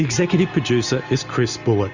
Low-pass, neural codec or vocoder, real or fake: 7.2 kHz; none; real